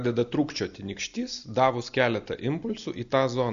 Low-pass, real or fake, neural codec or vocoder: 7.2 kHz; real; none